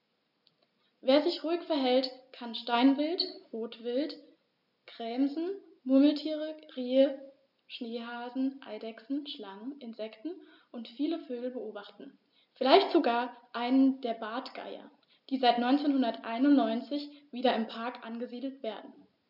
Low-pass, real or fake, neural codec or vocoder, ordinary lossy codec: 5.4 kHz; real; none; none